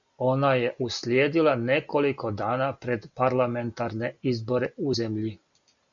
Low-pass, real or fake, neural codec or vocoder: 7.2 kHz; real; none